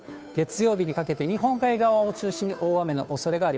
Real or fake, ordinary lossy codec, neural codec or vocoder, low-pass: fake; none; codec, 16 kHz, 2 kbps, FunCodec, trained on Chinese and English, 25 frames a second; none